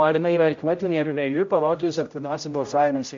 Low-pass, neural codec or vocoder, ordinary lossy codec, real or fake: 7.2 kHz; codec, 16 kHz, 0.5 kbps, X-Codec, HuBERT features, trained on general audio; MP3, 48 kbps; fake